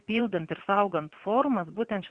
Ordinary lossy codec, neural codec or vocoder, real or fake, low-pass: Opus, 64 kbps; vocoder, 22.05 kHz, 80 mel bands, WaveNeXt; fake; 9.9 kHz